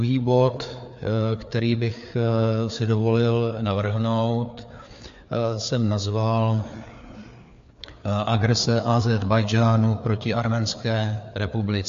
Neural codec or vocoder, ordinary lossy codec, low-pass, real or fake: codec, 16 kHz, 4 kbps, FreqCodec, larger model; MP3, 48 kbps; 7.2 kHz; fake